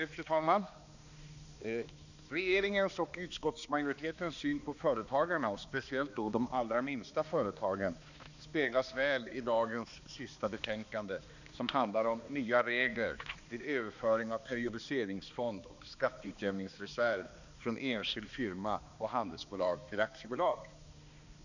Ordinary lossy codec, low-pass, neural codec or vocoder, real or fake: none; 7.2 kHz; codec, 16 kHz, 2 kbps, X-Codec, HuBERT features, trained on balanced general audio; fake